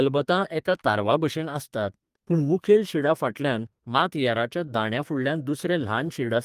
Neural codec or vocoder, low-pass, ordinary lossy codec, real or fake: codec, 32 kHz, 1.9 kbps, SNAC; 14.4 kHz; Opus, 32 kbps; fake